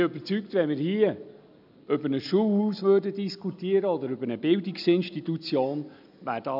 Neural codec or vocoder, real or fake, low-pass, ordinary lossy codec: none; real; 5.4 kHz; none